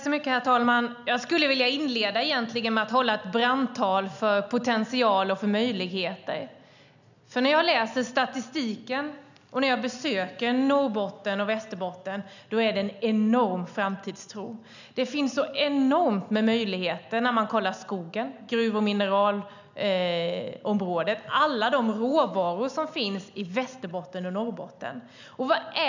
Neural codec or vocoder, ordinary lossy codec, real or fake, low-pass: none; none; real; 7.2 kHz